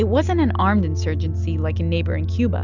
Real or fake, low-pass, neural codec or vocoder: real; 7.2 kHz; none